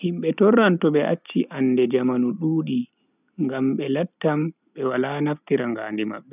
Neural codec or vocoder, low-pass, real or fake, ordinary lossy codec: none; 3.6 kHz; real; none